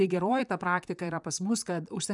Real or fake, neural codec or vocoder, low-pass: fake; vocoder, 44.1 kHz, 128 mel bands, Pupu-Vocoder; 10.8 kHz